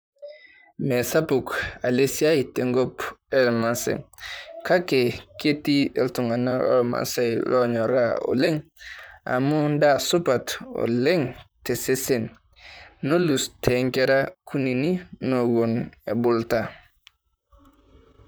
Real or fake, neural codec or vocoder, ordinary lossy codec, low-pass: fake; vocoder, 44.1 kHz, 128 mel bands, Pupu-Vocoder; none; none